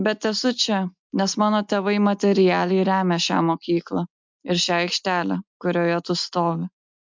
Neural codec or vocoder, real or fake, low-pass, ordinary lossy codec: none; real; 7.2 kHz; MP3, 64 kbps